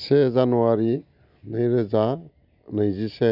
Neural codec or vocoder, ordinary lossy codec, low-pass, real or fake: none; none; 5.4 kHz; real